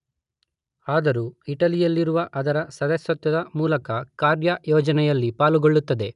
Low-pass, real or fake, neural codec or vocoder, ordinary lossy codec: 10.8 kHz; real; none; none